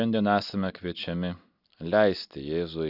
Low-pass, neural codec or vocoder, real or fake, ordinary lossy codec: 5.4 kHz; none; real; Opus, 64 kbps